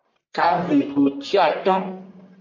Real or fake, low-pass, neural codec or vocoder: fake; 7.2 kHz; codec, 44.1 kHz, 1.7 kbps, Pupu-Codec